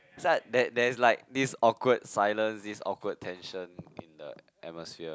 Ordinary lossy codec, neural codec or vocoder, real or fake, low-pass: none; none; real; none